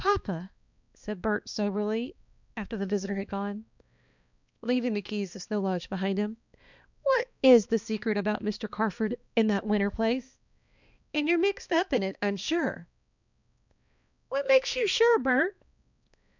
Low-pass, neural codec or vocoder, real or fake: 7.2 kHz; codec, 16 kHz, 2 kbps, X-Codec, HuBERT features, trained on balanced general audio; fake